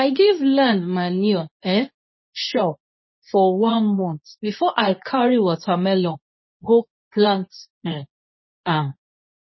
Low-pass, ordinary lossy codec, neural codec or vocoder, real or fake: 7.2 kHz; MP3, 24 kbps; codec, 24 kHz, 0.9 kbps, WavTokenizer, medium speech release version 2; fake